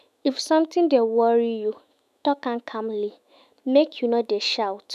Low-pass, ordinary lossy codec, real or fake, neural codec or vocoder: 14.4 kHz; none; fake; autoencoder, 48 kHz, 128 numbers a frame, DAC-VAE, trained on Japanese speech